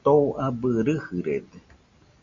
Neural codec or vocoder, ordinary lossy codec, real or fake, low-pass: none; Opus, 64 kbps; real; 7.2 kHz